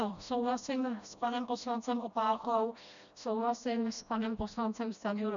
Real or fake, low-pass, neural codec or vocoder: fake; 7.2 kHz; codec, 16 kHz, 1 kbps, FreqCodec, smaller model